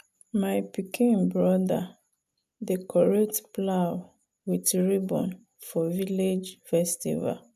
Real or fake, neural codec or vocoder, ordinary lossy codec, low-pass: real; none; none; 14.4 kHz